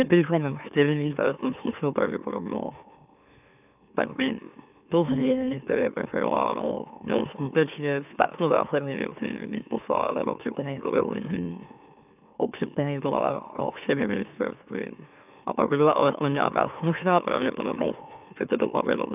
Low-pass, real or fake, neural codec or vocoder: 3.6 kHz; fake; autoencoder, 44.1 kHz, a latent of 192 numbers a frame, MeloTTS